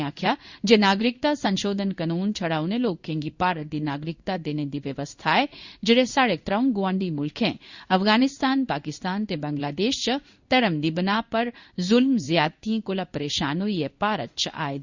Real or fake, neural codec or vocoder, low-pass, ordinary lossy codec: fake; codec, 16 kHz in and 24 kHz out, 1 kbps, XY-Tokenizer; 7.2 kHz; Opus, 64 kbps